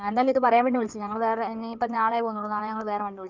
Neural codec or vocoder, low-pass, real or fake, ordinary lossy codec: codec, 16 kHz in and 24 kHz out, 2.2 kbps, FireRedTTS-2 codec; 7.2 kHz; fake; Opus, 24 kbps